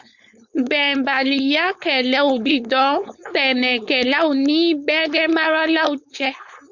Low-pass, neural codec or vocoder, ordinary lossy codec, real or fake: 7.2 kHz; codec, 16 kHz, 4.8 kbps, FACodec; Opus, 64 kbps; fake